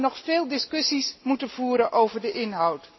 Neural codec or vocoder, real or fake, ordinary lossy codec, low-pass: none; real; MP3, 24 kbps; 7.2 kHz